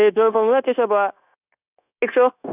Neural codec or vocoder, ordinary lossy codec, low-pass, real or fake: codec, 16 kHz, 0.9 kbps, LongCat-Audio-Codec; none; 3.6 kHz; fake